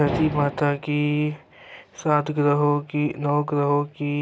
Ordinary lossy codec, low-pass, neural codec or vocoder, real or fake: none; none; none; real